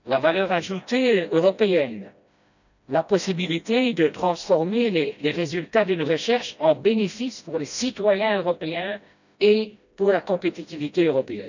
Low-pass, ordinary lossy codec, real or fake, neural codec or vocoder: 7.2 kHz; none; fake; codec, 16 kHz, 1 kbps, FreqCodec, smaller model